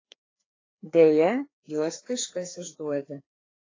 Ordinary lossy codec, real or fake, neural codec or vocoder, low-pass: AAC, 32 kbps; fake; codec, 16 kHz, 2 kbps, FreqCodec, larger model; 7.2 kHz